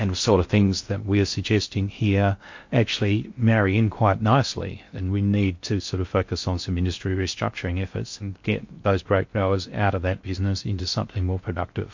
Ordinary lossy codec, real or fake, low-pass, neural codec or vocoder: MP3, 48 kbps; fake; 7.2 kHz; codec, 16 kHz in and 24 kHz out, 0.6 kbps, FocalCodec, streaming, 4096 codes